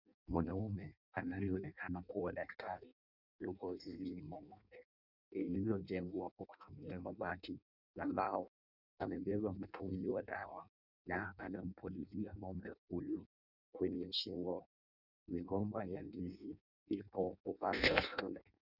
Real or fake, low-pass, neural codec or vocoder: fake; 5.4 kHz; codec, 16 kHz in and 24 kHz out, 0.6 kbps, FireRedTTS-2 codec